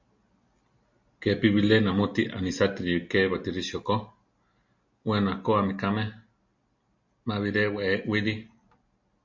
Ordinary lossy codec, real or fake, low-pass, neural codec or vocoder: MP3, 48 kbps; real; 7.2 kHz; none